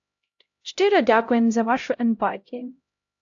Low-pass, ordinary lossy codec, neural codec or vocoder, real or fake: 7.2 kHz; AAC, 48 kbps; codec, 16 kHz, 0.5 kbps, X-Codec, HuBERT features, trained on LibriSpeech; fake